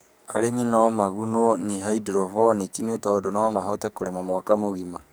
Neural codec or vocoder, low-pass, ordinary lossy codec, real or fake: codec, 44.1 kHz, 2.6 kbps, SNAC; none; none; fake